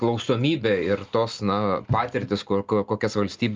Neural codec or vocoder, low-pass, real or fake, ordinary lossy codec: none; 7.2 kHz; real; Opus, 32 kbps